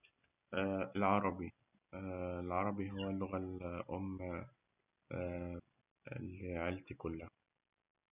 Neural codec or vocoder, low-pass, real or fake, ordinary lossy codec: none; 3.6 kHz; real; none